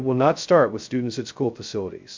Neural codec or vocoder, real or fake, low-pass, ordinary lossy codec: codec, 16 kHz, 0.2 kbps, FocalCodec; fake; 7.2 kHz; MP3, 64 kbps